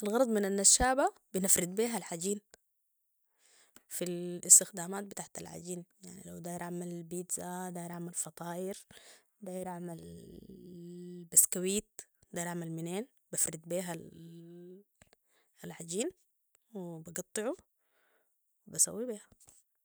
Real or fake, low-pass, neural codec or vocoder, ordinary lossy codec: real; none; none; none